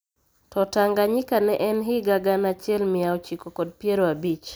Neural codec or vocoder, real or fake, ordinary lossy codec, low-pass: none; real; none; none